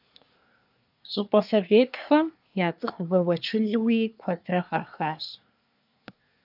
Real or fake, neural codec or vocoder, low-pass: fake; codec, 24 kHz, 1 kbps, SNAC; 5.4 kHz